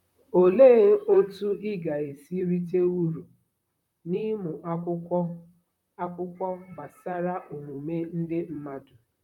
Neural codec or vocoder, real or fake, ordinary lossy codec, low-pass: vocoder, 44.1 kHz, 128 mel bands, Pupu-Vocoder; fake; none; 19.8 kHz